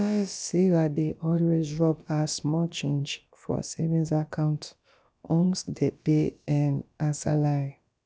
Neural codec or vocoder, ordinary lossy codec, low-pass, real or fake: codec, 16 kHz, about 1 kbps, DyCAST, with the encoder's durations; none; none; fake